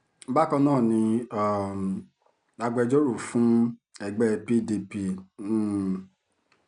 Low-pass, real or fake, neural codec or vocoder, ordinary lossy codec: 9.9 kHz; real; none; none